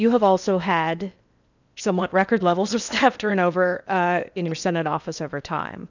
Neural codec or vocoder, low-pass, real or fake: codec, 16 kHz in and 24 kHz out, 0.8 kbps, FocalCodec, streaming, 65536 codes; 7.2 kHz; fake